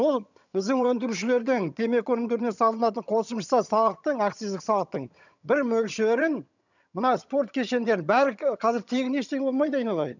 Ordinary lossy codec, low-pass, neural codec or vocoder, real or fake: none; 7.2 kHz; vocoder, 22.05 kHz, 80 mel bands, HiFi-GAN; fake